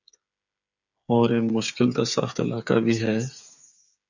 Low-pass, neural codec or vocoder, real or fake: 7.2 kHz; codec, 16 kHz, 8 kbps, FreqCodec, smaller model; fake